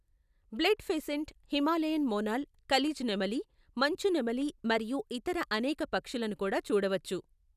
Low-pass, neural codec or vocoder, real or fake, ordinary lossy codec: 14.4 kHz; none; real; none